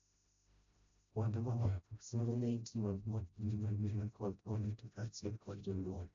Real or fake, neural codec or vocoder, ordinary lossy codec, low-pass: fake; codec, 16 kHz, 0.5 kbps, FreqCodec, smaller model; AAC, 64 kbps; 7.2 kHz